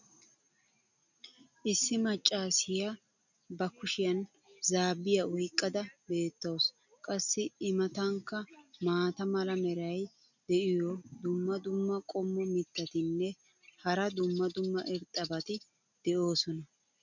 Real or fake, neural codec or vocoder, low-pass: real; none; 7.2 kHz